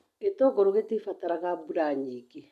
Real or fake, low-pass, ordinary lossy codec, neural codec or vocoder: real; 14.4 kHz; none; none